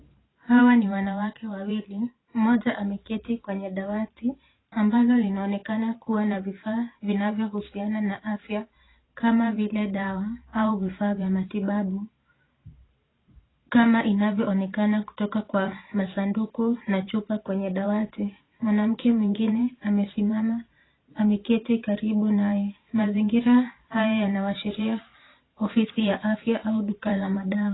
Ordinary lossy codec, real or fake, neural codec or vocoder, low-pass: AAC, 16 kbps; fake; vocoder, 44.1 kHz, 128 mel bands every 512 samples, BigVGAN v2; 7.2 kHz